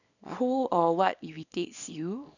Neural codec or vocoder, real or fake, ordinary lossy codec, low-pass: codec, 24 kHz, 0.9 kbps, WavTokenizer, small release; fake; none; 7.2 kHz